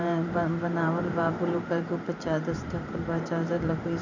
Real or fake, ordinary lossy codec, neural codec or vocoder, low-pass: fake; none; vocoder, 44.1 kHz, 128 mel bands every 512 samples, BigVGAN v2; 7.2 kHz